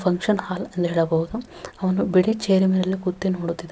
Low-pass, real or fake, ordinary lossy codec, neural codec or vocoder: none; real; none; none